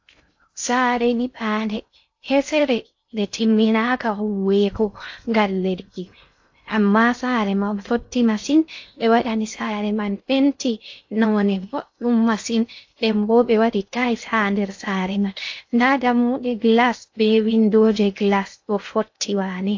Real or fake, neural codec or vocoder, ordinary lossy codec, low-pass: fake; codec, 16 kHz in and 24 kHz out, 0.6 kbps, FocalCodec, streaming, 2048 codes; AAC, 48 kbps; 7.2 kHz